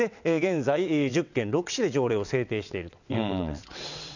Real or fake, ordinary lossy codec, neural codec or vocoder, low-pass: fake; none; vocoder, 22.05 kHz, 80 mel bands, WaveNeXt; 7.2 kHz